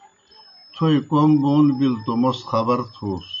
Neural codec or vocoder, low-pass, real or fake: none; 7.2 kHz; real